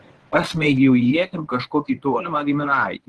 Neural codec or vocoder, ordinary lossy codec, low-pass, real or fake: codec, 24 kHz, 0.9 kbps, WavTokenizer, medium speech release version 1; Opus, 16 kbps; 10.8 kHz; fake